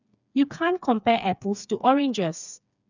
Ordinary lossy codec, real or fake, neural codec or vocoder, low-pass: none; fake; codec, 16 kHz, 4 kbps, FreqCodec, smaller model; 7.2 kHz